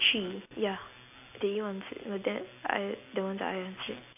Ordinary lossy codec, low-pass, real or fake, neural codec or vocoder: none; 3.6 kHz; real; none